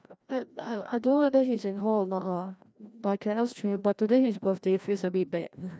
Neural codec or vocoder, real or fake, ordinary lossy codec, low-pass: codec, 16 kHz, 1 kbps, FreqCodec, larger model; fake; none; none